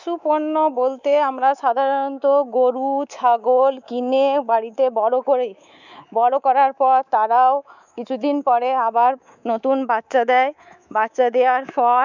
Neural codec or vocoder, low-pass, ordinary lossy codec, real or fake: codec, 24 kHz, 3.1 kbps, DualCodec; 7.2 kHz; none; fake